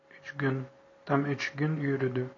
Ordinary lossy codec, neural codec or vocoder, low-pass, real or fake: AAC, 32 kbps; none; 7.2 kHz; real